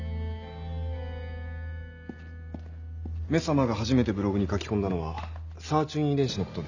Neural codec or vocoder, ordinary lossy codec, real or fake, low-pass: none; none; real; 7.2 kHz